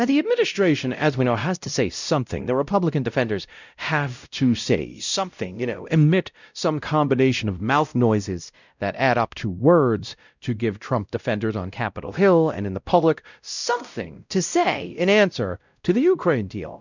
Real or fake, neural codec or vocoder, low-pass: fake; codec, 16 kHz, 0.5 kbps, X-Codec, WavLM features, trained on Multilingual LibriSpeech; 7.2 kHz